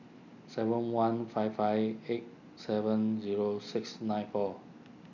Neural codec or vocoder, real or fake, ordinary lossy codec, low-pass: none; real; none; 7.2 kHz